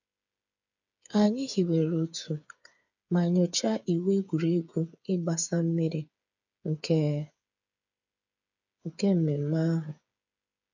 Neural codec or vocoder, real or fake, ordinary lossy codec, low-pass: codec, 16 kHz, 8 kbps, FreqCodec, smaller model; fake; none; 7.2 kHz